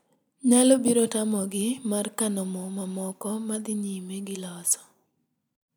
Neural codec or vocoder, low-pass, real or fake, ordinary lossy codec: none; none; real; none